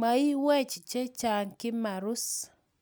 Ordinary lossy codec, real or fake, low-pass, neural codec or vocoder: none; real; none; none